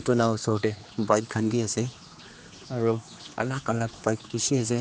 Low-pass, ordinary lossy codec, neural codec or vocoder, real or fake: none; none; codec, 16 kHz, 2 kbps, X-Codec, HuBERT features, trained on general audio; fake